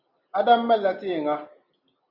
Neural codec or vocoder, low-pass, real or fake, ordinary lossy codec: none; 5.4 kHz; real; Opus, 64 kbps